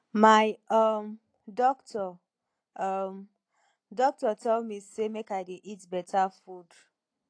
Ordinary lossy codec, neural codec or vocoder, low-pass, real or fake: AAC, 48 kbps; none; 9.9 kHz; real